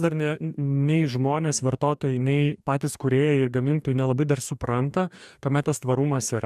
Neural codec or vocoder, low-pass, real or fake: codec, 44.1 kHz, 2.6 kbps, DAC; 14.4 kHz; fake